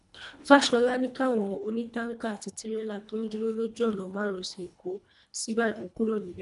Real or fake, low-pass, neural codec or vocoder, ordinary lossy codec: fake; 10.8 kHz; codec, 24 kHz, 1.5 kbps, HILCodec; none